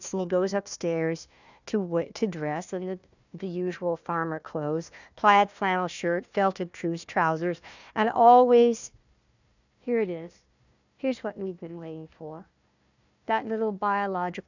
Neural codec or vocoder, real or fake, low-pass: codec, 16 kHz, 1 kbps, FunCodec, trained on Chinese and English, 50 frames a second; fake; 7.2 kHz